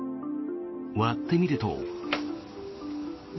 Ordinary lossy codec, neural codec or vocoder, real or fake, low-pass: MP3, 24 kbps; none; real; 7.2 kHz